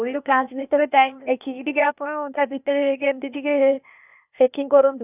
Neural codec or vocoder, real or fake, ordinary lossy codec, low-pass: codec, 16 kHz, 0.8 kbps, ZipCodec; fake; none; 3.6 kHz